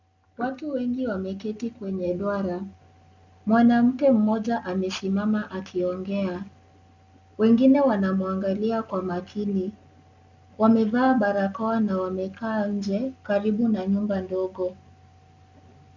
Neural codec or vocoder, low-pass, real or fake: none; 7.2 kHz; real